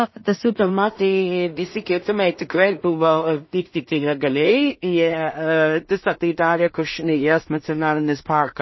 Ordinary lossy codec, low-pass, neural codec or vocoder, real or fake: MP3, 24 kbps; 7.2 kHz; codec, 16 kHz in and 24 kHz out, 0.4 kbps, LongCat-Audio-Codec, two codebook decoder; fake